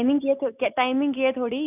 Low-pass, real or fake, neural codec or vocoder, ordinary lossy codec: 3.6 kHz; real; none; none